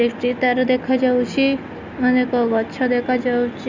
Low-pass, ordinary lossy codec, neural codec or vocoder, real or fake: 7.2 kHz; Opus, 64 kbps; none; real